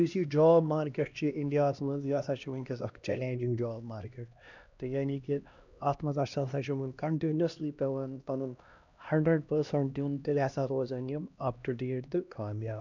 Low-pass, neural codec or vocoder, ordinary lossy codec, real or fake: 7.2 kHz; codec, 16 kHz, 1 kbps, X-Codec, HuBERT features, trained on LibriSpeech; none; fake